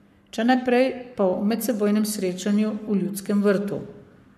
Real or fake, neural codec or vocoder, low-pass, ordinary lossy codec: fake; codec, 44.1 kHz, 7.8 kbps, Pupu-Codec; 14.4 kHz; MP3, 96 kbps